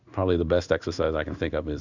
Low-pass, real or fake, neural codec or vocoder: 7.2 kHz; fake; codec, 16 kHz in and 24 kHz out, 1 kbps, XY-Tokenizer